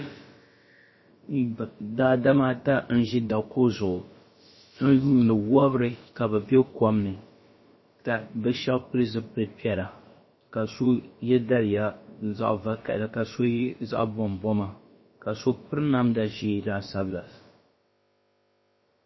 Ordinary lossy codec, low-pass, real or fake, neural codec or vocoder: MP3, 24 kbps; 7.2 kHz; fake; codec, 16 kHz, about 1 kbps, DyCAST, with the encoder's durations